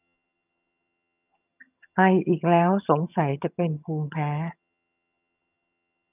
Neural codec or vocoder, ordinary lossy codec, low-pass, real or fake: vocoder, 22.05 kHz, 80 mel bands, HiFi-GAN; none; 3.6 kHz; fake